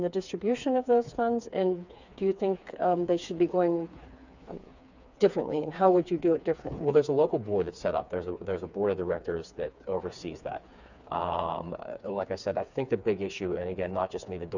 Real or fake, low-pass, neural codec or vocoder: fake; 7.2 kHz; codec, 16 kHz, 4 kbps, FreqCodec, smaller model